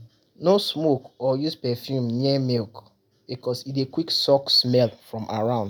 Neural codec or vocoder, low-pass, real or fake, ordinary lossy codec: none; none; real; none